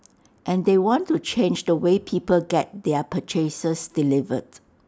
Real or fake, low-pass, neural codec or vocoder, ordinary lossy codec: real; none; none; none